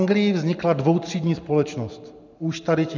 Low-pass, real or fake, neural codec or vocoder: 7.2 kHz; real; none